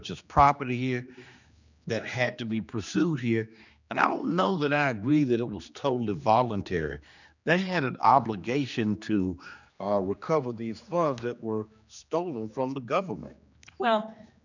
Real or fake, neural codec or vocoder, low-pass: fake; codec, 16 kHz, 2 kbps, X-Codec, HuBERT features, trained on general audio; 7.2 kHz